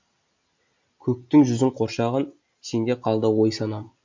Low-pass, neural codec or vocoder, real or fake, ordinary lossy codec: 7.2 kHz; none; real; MP3, 48 kbps